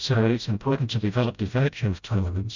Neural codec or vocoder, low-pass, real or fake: codec, 16 kHz, 0.5 kbps, FreqCodec, smaller model; 7.2 kHz; fake